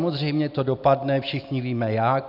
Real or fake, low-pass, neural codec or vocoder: real; 5.4 kHz; none